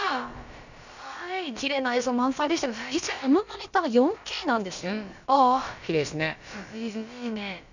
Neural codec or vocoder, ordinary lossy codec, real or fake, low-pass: codec, 16 kHz, about 1 kbps, DyCAST, with the encoder's durations; none; fake; 7.2 kHz